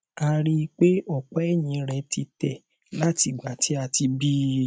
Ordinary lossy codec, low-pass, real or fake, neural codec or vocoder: none; none; real; none